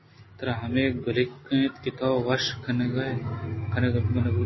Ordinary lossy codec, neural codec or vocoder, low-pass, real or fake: MP3, 24 kbps; none; 7.2 kHz; real